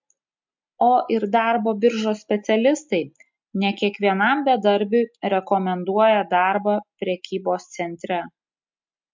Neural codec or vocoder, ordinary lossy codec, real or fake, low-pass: none; MP3, 64 kbps; real; 7.2 kHz